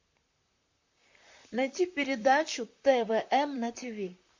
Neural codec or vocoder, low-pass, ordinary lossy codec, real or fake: vocoder, 44.1 kHz, 128 mel bands, Pupu-Vocoder; 7.2 kHz; MP3, 48 kbps; fake